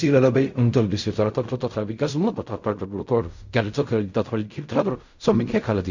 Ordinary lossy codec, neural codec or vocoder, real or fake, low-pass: none; codec, 16 kHz in and 24 kHz out, 0.4 kbps, LongCat-Audio-Codec, fine tuned four codebook decoder; fake; 7.2 kHz